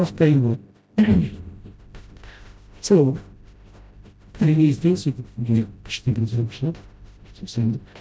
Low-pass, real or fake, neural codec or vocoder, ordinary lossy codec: none; fake; codec, 16 kHz, 0.5 kbps, FreqCodec, smaller model; none